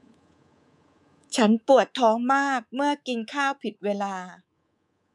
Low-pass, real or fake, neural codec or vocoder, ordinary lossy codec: none; fake; codec, 24 kHz, 3.1 kbps, DualCodec; none